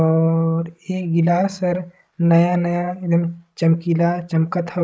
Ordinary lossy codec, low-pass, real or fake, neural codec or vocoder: none; none; fake; codec, 16 kHz, 16 kbps, FreqCodec, larger model